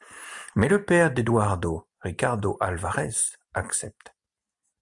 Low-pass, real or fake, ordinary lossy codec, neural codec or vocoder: 10.8 kHz; real; MP3, 96 kbps; none